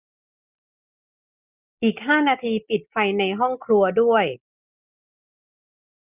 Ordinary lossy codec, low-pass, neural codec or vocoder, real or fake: none; 3.6 kHz; none; real